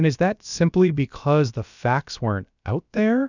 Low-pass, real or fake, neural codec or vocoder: 7.2 kHz; fake; codec, 16 kHz, about 1 kbps, DyCAST, with the encoder's durations